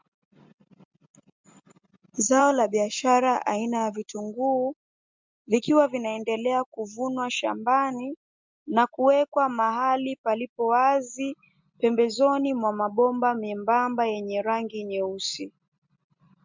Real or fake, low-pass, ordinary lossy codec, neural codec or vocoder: real; 7.2 kHz; MP3, 64 kbps; none